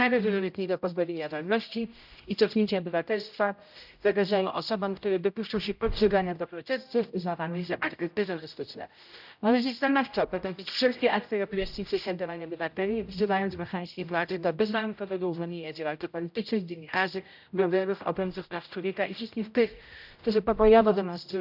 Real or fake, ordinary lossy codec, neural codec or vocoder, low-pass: fake; none; codec, 16 kHz, 0.5 kbps, X-Codec, HuBERT features, trained on general audio; 5.4 kHz